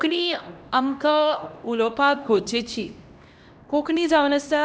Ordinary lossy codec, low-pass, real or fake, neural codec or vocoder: none; none; fake; codec, 16 kHz, 1 kbps, X-Codec, HuBERT features, trained on LibriSpeech